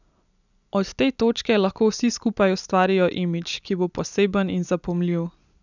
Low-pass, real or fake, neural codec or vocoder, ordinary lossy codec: 7.2 kHz; real; none; none